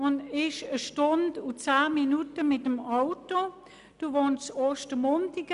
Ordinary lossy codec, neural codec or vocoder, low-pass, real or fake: none; none; 10.8 kHz; real